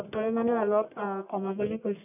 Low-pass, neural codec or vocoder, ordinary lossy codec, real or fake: 3.6 kHz; codec, 44.1 kHz, 1.7 kbps, Pupu-Codec; none; fake